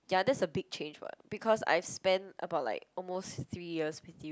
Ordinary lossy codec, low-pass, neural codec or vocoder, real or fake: none; none; none; real